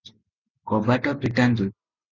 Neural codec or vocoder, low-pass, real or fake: none; 7.2 kHz; real